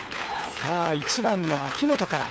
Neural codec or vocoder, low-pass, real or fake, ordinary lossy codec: codec, 16 kHz, 4 kbps, FunCodec, trained on LibriTTS, 50 frames a second; none; fake; none